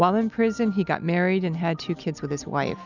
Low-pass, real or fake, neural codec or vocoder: 7.2 kHz; real; none